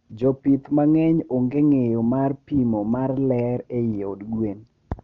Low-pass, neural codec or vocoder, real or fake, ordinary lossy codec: 7.2 kHz; none; real; Opus, 32 kbps